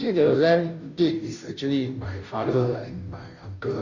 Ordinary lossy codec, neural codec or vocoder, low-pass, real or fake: none; codec, 16 kHz, 0.5 kbps, FunCodec, trained on Chinese and English, 25 frames a second; 7.2 kHz; fake